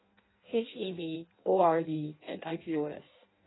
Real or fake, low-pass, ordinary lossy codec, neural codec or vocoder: fake; 7.2 kHz; AAC, 16 kbps; codec, 16 kHz in and 24 kHz out, 0.6 kbps, FireRedTTS-2 codec